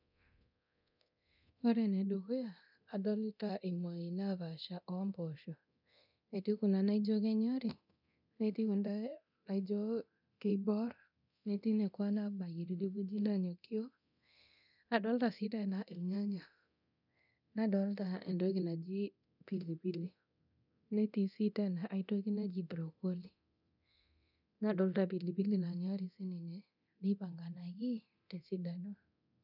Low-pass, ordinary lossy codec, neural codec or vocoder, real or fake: 5.4 kHz; none; codec, 24 kHz, 0.9 kbps, DualCodec; fake